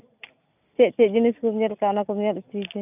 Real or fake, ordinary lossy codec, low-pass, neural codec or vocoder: real; none; 3.6 kHz; none